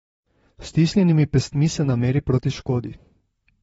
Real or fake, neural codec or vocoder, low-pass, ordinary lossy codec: fake; vocoder, 44.1 kHz, 128 mel bands, Pupu-Vocoder; 19.8 kHz; AAC, 24 kbps